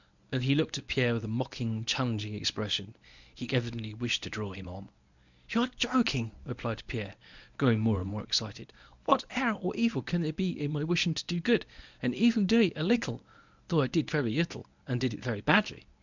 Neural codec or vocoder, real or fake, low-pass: codec, 24 kHz, 0.9 kbps, WavTokenizer, medium speech release version 1; fake; 7.2 kHz